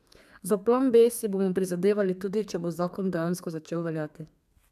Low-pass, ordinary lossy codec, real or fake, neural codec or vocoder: 14.4 kHz; none; fake; codec, 32 kHz, 1.9 kbps, SNAC